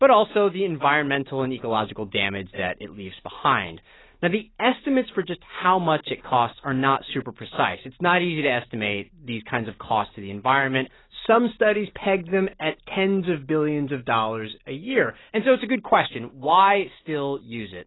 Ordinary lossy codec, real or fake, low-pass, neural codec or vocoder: AAC, 16 kbps; real; 7.2 kHz; none